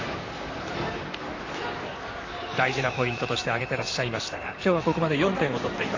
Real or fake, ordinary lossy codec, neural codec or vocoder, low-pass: fake; AAC, 32 kbps; vocoder, 44.1 kHz, 128 mel bands, Pupu-Vocoder; 7.2 kHz